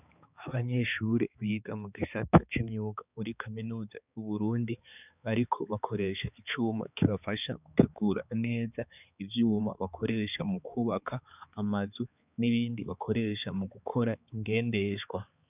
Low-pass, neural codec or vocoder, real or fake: 3.6 kHz; codec, 16 kHz, 4 kbps, X-Codec, HuBERT features, trained on balanced general audio; fake